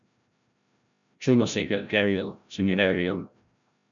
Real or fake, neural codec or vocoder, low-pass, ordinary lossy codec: fake; codec, 16 kHz, 0.5 kbps, FreqCodec, larger model; 7.2 kHz; MP3, 96 kbps